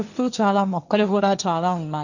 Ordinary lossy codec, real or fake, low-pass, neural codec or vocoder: none; fake; 7.2 kHz; codec, 16 kHz, 1.1 kbps, Voila-Tokenizer